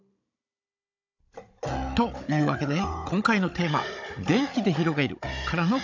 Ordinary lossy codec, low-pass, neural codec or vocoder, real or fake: AAC, 48 kbps; 7.2 kHz; codec, 16 kHz, 16 kbps, FunCodec, trained on Chinese and English, 50 frames a second; fake